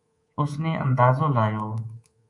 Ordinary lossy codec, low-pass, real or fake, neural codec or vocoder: AAC, 64 kbps; 10.8 kHz; fake; codec, 24 kHz, 3.1 kbps, DualCodec